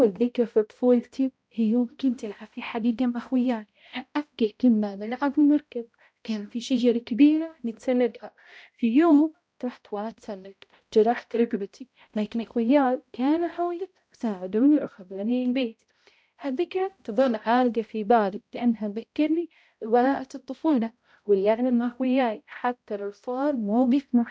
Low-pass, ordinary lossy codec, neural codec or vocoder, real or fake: none; none; codec, 16 kHz, 0.5 kbps, X-Codec, HuBERT features, trained on balanced general audio; fake